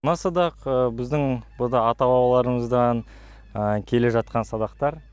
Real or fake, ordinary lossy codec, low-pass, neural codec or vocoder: real; none; none; none